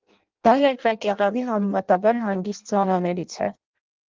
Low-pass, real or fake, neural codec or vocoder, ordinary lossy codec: 7.2 kHz; fake; codec, 16 kHz in and 24 kHz out, 0.6 kbps, FireRedTTS-2 codec; Opus, 32 kbps